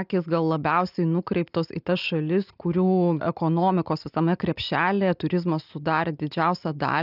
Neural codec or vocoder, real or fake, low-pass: none; real; 5.4 kHz